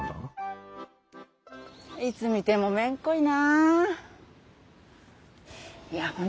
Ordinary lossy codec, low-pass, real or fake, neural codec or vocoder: none; none; real; none